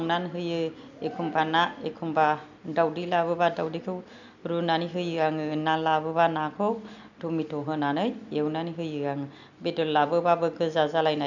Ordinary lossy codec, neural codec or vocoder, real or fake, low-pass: none; none; real; 7.2 kHz